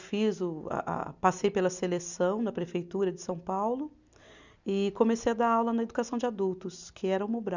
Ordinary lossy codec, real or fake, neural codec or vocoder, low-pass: none; real; none; 7.2 kHz